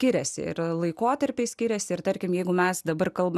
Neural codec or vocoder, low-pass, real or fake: none; 14.4 kHz; real